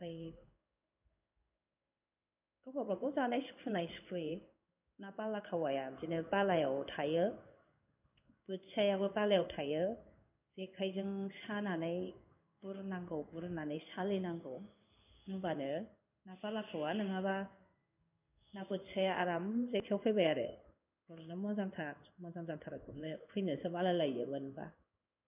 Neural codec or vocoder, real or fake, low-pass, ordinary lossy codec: codec, 16 kHz in and 24 kHz out, 1 kbps, XY-Tokenizer; fake; 3.6 kHz; none